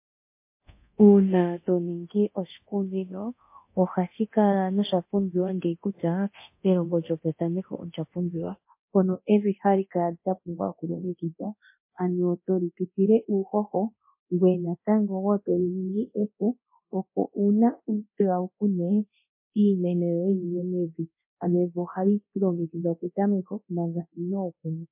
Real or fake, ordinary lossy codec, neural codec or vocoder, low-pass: fake; MP3, 24 kbps; codec, 24 kHz, 0.9 kbps, DualCodec; 3.6 kHz